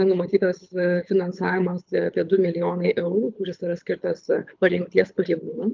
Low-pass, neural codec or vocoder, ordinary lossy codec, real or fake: 7.2 kHz; codec, 24 kHz, 6 kbps, HILCodec; Opus, 24 kbps; fake